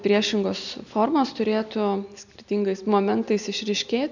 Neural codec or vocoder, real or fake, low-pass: none; real; 7.2 kHz